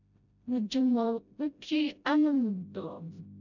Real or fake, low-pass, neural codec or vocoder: fake; 7.2 kHz; codec, 16 kHz, 0.5 kbps, FreqCodec, smaller model